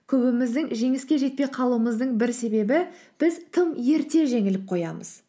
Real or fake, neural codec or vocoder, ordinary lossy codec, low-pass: real; none; none; none